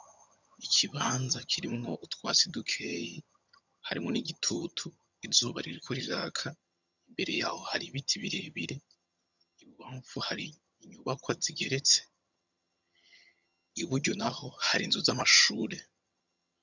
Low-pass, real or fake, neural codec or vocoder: 7.2 kHz; fake; vocoder, 22.05 kHz, 80 mel bands, HiFi-GAN